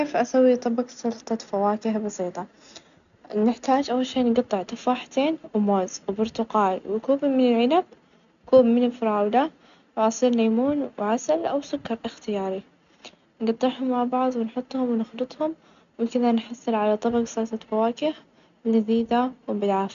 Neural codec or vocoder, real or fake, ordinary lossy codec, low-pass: none; real; none; 7.2 kHz